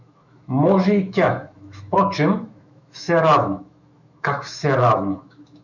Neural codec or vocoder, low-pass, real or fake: autoencoder, 48 kHz, 128 numbers a frame, DAC-VAE, trained on Japanese speech; 7.2 kHz; fake